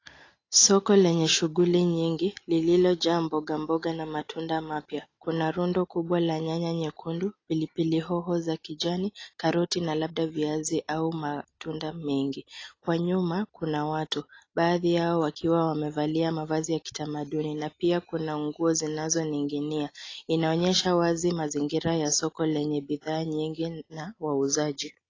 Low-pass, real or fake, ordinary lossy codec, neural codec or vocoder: 7.2 kHz; real; AAC, 32 kbps; none